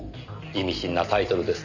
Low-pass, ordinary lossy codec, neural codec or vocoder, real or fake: 7.2 kHz; none; none; real